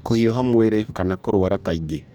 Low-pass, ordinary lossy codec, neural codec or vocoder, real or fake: 19.8 kHz; none; codec, 44.1 kHz, 2.6 kbps, DAC; fake